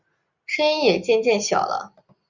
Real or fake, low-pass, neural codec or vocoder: real; 7.2 kHz; none